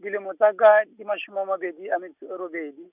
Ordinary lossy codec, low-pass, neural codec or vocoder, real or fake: none; 3.6 kHz; none; real